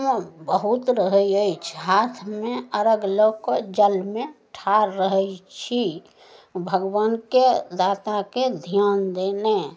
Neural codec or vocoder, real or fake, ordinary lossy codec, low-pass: none; real; none; none